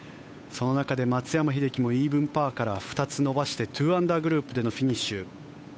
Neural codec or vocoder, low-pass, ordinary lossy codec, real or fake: codec, 16 kHz, 8 kbps, FunCodec, trained on Chinese and English, 25 frames a second; none; none; fake